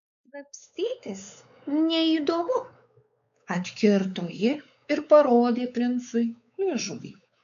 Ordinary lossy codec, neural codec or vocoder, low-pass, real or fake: MP3, 96 kbps; codec, 16 kHz, 4 kbps, X-Codec, WavLM features, trained on Multilingual LibriSpeech; 7.2 kHz; fake